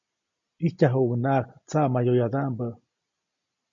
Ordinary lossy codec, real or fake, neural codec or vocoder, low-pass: MP3, 48 kbps; real; none; 7.2 kHz